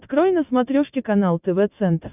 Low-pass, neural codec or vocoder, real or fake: 3.6 kHz; none; real